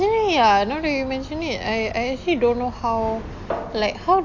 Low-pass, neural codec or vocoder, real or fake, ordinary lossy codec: 7.2 kHz; none; real; AAC, 48 kbps